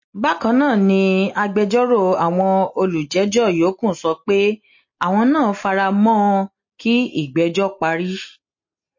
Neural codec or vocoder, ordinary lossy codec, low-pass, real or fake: none; MP3, 32 kbps; 7.2 kHz; real